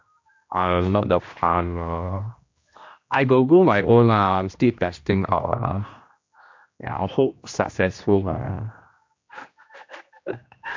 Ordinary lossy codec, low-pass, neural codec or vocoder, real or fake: MP3, 48 kbps; 7.2 kHz; codec, 16 kHz, 1 kbps, X-Codec, HuBERT features, trained on general audio; fake